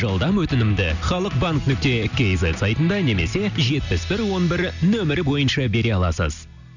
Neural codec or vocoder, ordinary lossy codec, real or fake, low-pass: none; none; real; 7.2 kHz